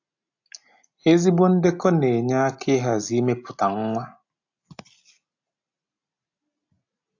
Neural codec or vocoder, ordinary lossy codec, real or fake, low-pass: none; AAC, 48 kbps; real; 7.2 kHz